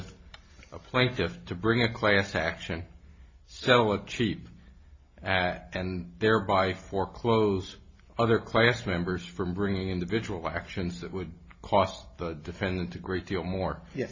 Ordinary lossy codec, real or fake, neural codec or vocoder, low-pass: MP3, 32 kbps; real; none; 7.2 kHz